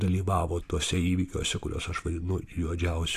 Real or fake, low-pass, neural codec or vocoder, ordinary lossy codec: real; 14.4 kHz; none; AAC, 64 kbps